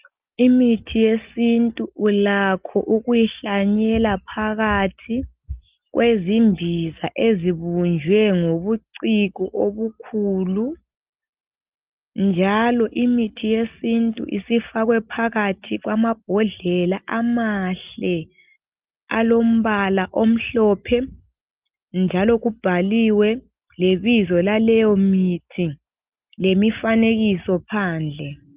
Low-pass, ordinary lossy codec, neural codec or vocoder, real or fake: 3.6 kHz; Opus, 32 kbps; none; real